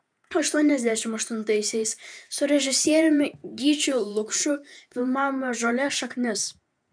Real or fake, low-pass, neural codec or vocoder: fake; 9.9 kHz; vocoder, 48 kHz, 128 mel bands, Vocos